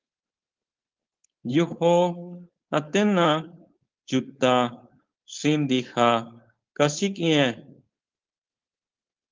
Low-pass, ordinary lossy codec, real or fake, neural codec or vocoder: 7.2 kHz; Opus, 32 kbps; fake; codec, 16 kHz, 4.8 kbps, FACodec